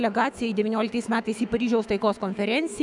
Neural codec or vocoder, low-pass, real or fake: autoencoder, 48 kHz, 128 numbers a frame, DAC-VAE, trained on Japanese speech; 10.8 kHz; fake